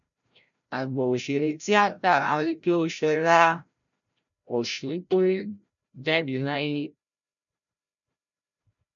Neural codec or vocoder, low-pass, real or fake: codec, 16 kHz, 0.5 kbps, FreqCodec, larger model; 7.2 kHz; fake